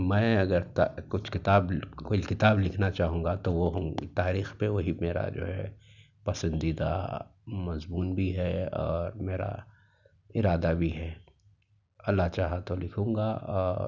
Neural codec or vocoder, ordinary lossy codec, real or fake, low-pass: none; none; real; 7.2 kHz